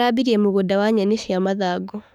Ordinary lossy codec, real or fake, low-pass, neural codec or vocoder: none; fake; 19.8 kHz; autoencoder, 48 kHz, 32 numbers a frame, DAC-VAE, trained on Japanese speech